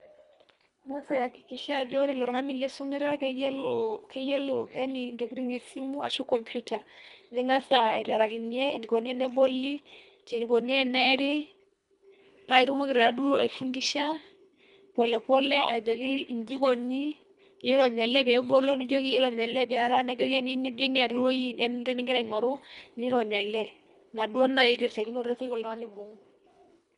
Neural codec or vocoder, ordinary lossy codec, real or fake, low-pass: codec, 24 kHz, 1.5 kbps, HILCodec; none; fake; 10.8 kHz